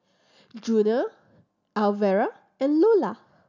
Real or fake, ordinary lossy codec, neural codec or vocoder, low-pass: real; none; none; 7.2 kHz